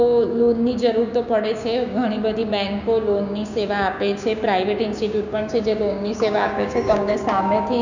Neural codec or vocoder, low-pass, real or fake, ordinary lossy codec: codec, 16 kHz, 6 kbps, DAC; 7.2 kHz; fake; none